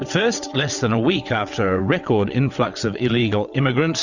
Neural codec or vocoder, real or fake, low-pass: vocoder, 22.05 kHz, 80 mel bands, WaveNeXt; fake; 7.2 kHz